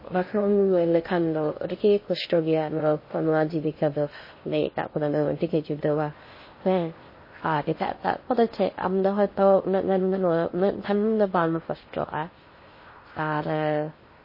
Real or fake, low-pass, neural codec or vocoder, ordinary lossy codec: fake; 5.4 kHz; codec, 16 kHz in and 24 kHz out, 0.6 kbps, FocalCodec, streaming, 2048 codes; MP3, 24 kbps